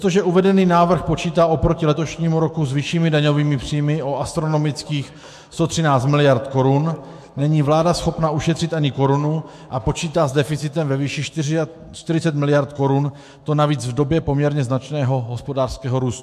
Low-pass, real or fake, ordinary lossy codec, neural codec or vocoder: 14.4 kHz; fake; MP3, 64 kbps; autoencoder, 48 kHz, 128 numbers a frame, DAC-VAE, trained on Japanese speech